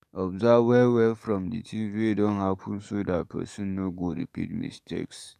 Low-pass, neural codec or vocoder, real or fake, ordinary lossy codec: 14.4 kHz; codec, 44.1 kHz, 7.8 kbps, DAC; fake; none